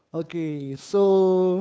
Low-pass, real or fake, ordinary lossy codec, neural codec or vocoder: none; fake; none; codec, 16 kHz, 2 kbps, FunCodec, trained on Chinese and English, 25 frames a second